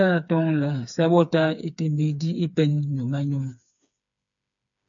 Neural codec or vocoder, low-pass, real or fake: codec, 16 kHz, 4 kbps, FreqCodec, smaller model; 7.2 kHz; fake